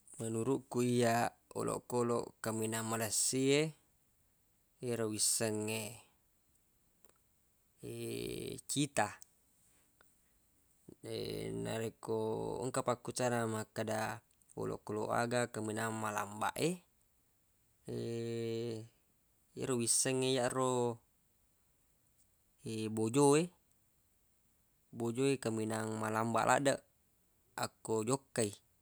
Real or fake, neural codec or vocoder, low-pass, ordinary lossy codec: real; none; none; none